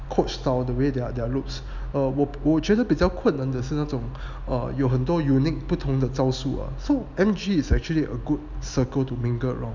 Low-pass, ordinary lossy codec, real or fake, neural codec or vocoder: 7.2 kHz; none; real; none